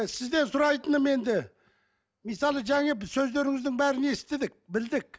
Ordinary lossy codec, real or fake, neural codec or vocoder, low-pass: none; real; none; none